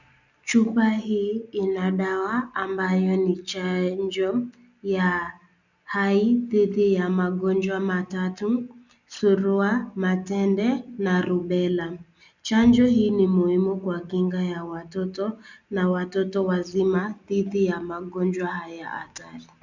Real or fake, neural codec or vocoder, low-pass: real; none; 7.2 kHz